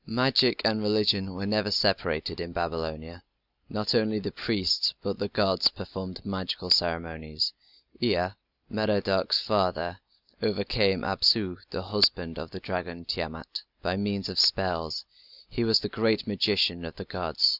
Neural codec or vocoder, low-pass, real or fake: none; 5.4 kHz; real